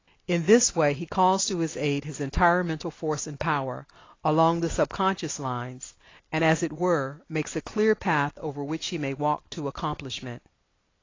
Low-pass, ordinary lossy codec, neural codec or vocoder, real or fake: 7.2 kHz; AAC, 32 kbps; none; real